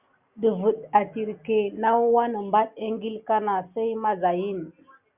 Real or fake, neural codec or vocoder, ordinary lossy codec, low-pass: real; none; Opus, 64 kbps; 3.6 kHz